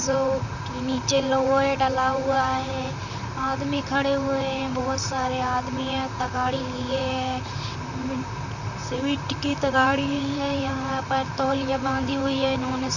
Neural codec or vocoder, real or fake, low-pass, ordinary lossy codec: vocoder, 44.1 kHz, 128 mel bands, Pupu-Vocoder; fake; 7.2 kHz; none